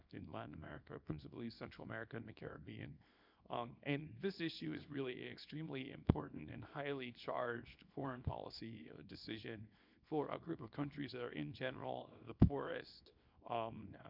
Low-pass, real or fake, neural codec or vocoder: 5.4 kHz; fake; codec, 24 kHz, 0.9 kbps, WavTokenizer, small release